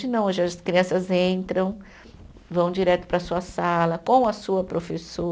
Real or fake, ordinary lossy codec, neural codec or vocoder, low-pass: real; none; none; none